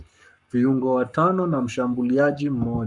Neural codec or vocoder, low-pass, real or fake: codec, 44.1 kHz, 7.8 kbps, Pupu-Codec; 10.8 kHz; fake